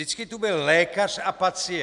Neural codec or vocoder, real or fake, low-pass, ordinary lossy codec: none; real; 10.8 kHz; MP3, 96 kbps